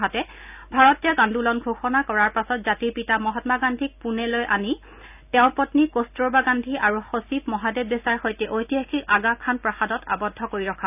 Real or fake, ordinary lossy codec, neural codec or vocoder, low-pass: real; none; none; 3.6 kHz